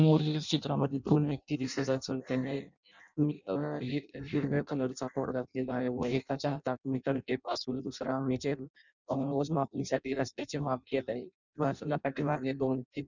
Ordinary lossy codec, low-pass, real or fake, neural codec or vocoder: none; 7.2 kHz; fake; codec, 16 kHz in and 24 kHz out, 0.6 kbps, FireRedTTS-2 codec